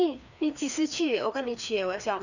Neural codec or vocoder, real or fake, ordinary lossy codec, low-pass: codec, 16 kHz, 2 kbps, FreqCodec, larger model; fake; none; 7.2 kHz